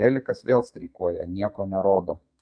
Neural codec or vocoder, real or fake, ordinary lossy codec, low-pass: codec, 32 kHz, 1.9 kbps, SNAC; fake; AAC, 64 kbps; 9.9 kHz